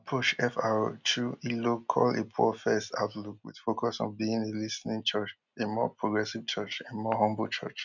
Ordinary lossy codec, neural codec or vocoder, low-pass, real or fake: none; none; 7.2 kHz; real